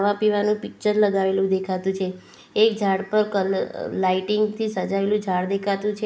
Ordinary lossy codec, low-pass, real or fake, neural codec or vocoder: none; none; real; none